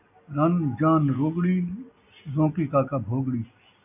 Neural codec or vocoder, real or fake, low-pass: none; real; 3.6 kHz